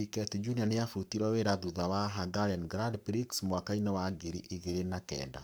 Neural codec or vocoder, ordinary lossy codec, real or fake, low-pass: codec, 44.1 kHz, 7.8 kbps, Pupu-Codec; none; fake; none